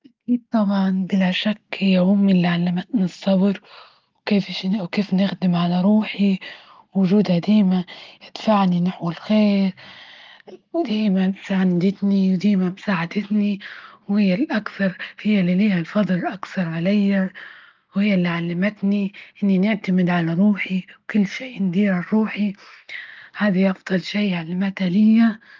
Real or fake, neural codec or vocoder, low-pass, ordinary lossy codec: real; none; 7.2 kHz; Opus, 32 kbps